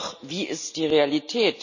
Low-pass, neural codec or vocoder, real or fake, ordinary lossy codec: 7.2 kHz; none; real; none